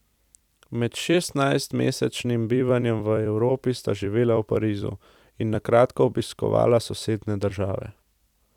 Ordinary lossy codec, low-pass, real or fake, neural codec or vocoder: none; 19.8 kHz; fake; vocoder, 44.1 kHz, 128 mel bands every 256 samples, BigVGAN v2